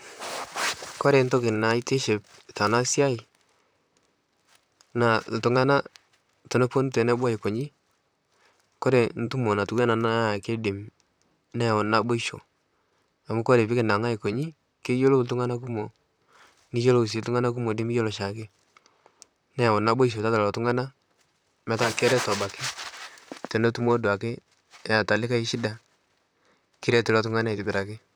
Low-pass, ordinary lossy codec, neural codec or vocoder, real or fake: none; none; vocoder, 44.1 kHz, 128 mel bands, Pupu-Vocoder; fake